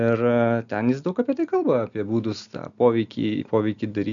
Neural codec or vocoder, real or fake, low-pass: none; real; 7.2 kHz